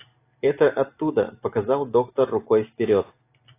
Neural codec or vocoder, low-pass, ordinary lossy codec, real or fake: none; 3.6 kHz; AAC, 24 kbps; real